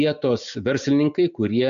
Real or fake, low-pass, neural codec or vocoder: real; 7.2 kHz; none